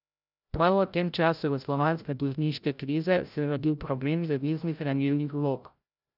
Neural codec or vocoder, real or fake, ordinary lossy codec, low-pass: codec, 16 kHz, 0.5 kbps, FreqCodec, larger model; fake; none; 5.4 kHz